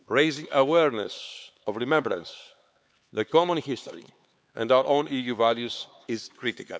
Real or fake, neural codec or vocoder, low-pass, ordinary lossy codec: fake; codec, 16 kHz, 4 kbps, X-Codec, HuBERT features, trained on LibriSpeech; none; none